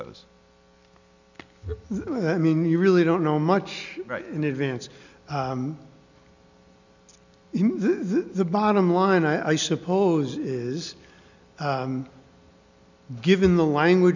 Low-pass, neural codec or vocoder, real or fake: 7.2 kHz; none; real